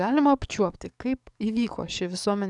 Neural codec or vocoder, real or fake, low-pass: codec, 44.1 kHz, 7.8 kbps, DAC; fake; 10.8 kHz